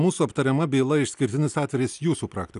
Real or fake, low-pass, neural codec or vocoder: real; 10.8 kHz; none